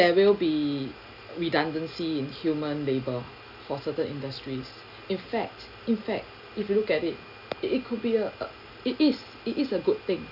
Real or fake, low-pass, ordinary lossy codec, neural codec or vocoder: real; 5.4 kHz; none; none